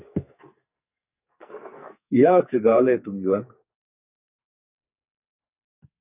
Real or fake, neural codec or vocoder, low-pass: fake; codec, 44.1 kHz, 2.6 kbps, SNAC; 3.6 kHz